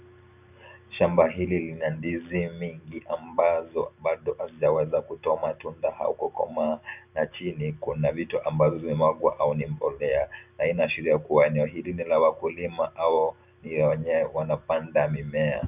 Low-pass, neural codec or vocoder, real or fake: 3.6 kHz; none; real